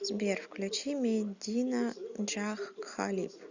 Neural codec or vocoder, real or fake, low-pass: none; real; 7.2 kHz